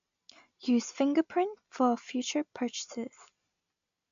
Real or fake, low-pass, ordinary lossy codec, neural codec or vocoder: real; 7.2 kHz; AAC, 64 kbps; none